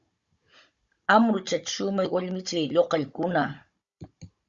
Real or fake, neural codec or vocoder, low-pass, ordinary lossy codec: fake; codec, 16 kHz, 16 kbps, FunCodec, trained on Chinese and English, 50 frames a second; 7.2 kHz; Opus, 64 kbps